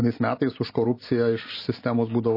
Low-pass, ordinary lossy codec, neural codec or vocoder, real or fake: 5.4 kHz; MP3, 24 kbps; none; real